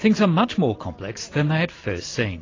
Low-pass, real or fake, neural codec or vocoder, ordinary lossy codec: 7.2 kHz; real; none; AAC, 32 kbps